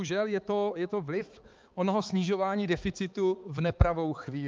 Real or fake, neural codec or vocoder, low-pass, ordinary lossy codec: fake; codec, 16 kHz, 4 kbps, X-Codec, HuBERT features, trained on balanced general audio; 7.2 kHz; Opus, 32 kbps